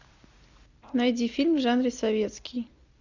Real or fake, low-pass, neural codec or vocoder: real; 7.2 kHz; none